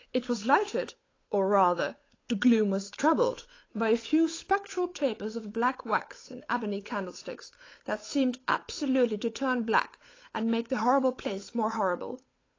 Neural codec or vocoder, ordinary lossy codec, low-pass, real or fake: codec, 16 kHz, 8 kbps, FunCodec, trained on Chinese and English, 25 frames a second; AAC, 32 kbps; 7.2 kHz; fake